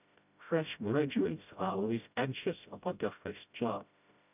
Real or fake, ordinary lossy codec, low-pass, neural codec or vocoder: fake; none; 3.6 kHz; codec, 16 kHz, 0.5 kbps, FreqCodec, smaller model